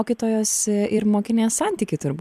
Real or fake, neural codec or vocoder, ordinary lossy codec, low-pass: fake; vocoder, 44.1 kHz, 128 mel bands every 256 samples, BigVGAN v2; MP3, 96 kbps; 14.4 kHz